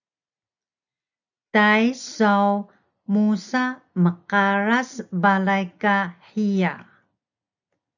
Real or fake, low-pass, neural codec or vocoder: real; 7.2 kHz; none